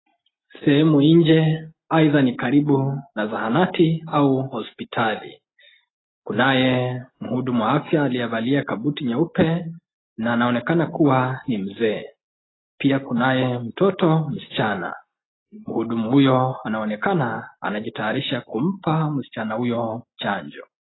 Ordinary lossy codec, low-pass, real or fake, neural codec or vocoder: AAC, 16 kbps; 7.2 kHz; real; none